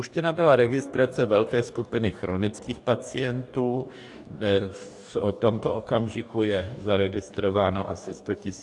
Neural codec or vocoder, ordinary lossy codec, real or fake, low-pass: codec, 44.1 kHz, 2.6 kbps, DAC; AAC, 64 kbps; fake; 10.8 kHz